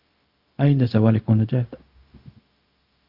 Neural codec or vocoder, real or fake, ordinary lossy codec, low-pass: codec, 16 kHz, 0.4 kbps, LongCat-Audio-Codec; fake; Opus, 32 kbps; 5.4 kHz